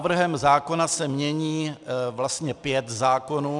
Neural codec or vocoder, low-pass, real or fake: none; 10.8 kHz; real